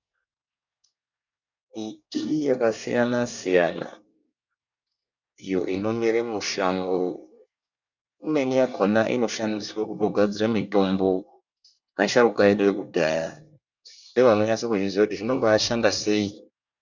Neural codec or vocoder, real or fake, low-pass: codec, 24 kHz, 1 kbps, SNAC; fake; 7.2 kHz